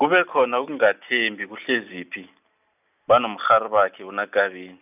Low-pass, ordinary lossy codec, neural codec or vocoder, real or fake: 3.6 kHz; none; none; real